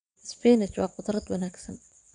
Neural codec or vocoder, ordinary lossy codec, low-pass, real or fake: vocoder, 22.05 kHz, 80 mel bands, WaveNeXt; none; 9.9 kHz; fake